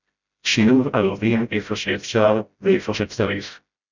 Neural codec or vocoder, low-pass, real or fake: codec, 16 kHz, 0.5 kbps, FreqCodec, smaller model; 7.2 kHz; fake